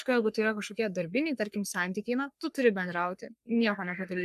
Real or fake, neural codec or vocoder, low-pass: fake; codec, 44.1 kHz, 3.4 kbps, Pupu-Codec; 14.4 kHz